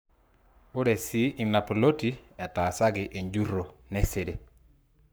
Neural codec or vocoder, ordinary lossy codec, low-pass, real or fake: codec, 44.1 kHz, 7.8 kbps, Pupu-Codec; none; none; fake